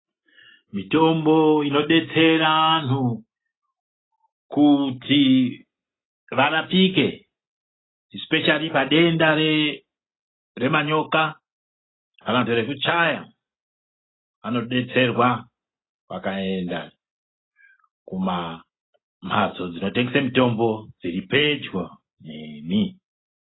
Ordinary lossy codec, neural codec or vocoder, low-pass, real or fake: AAC, 16 kbps; none; 7.2 kHz; real